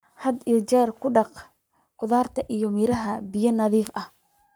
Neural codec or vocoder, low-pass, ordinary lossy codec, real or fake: codec, 44.1 kHz, 7.8 kbps, Pupu-Codec; none; none; fake